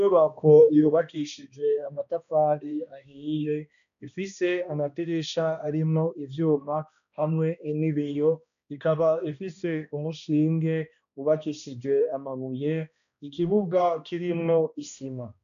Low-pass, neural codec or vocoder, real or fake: 7.2 kHz; codec, 16 kHz, 1 kbps, X-Codec, HuBERT features, trained on balanced general audio; fake